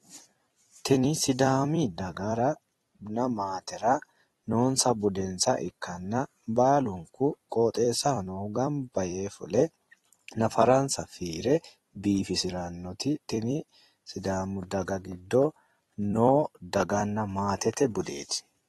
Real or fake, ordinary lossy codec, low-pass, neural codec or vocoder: fake; AAC, 32 kbps; 19.8 kHz; vocoder, 44.1 kHz, 128 mel bands every 256 samples, BigVGAN v2